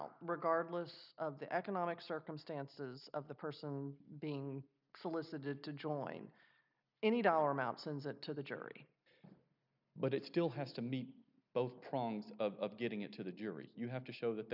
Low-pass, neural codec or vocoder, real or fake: 5.4 kHz; none; real